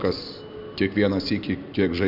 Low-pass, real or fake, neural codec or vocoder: 5.4 kHz; real; none